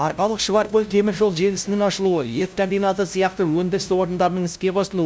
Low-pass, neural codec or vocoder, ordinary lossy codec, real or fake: none; codec, 16 kHz, 0.5 kbps, FunCodec, trained on LibriTTS, 25 frames a second; none; fake